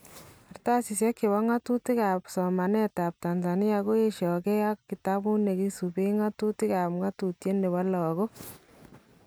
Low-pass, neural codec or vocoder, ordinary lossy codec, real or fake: none; none; none; real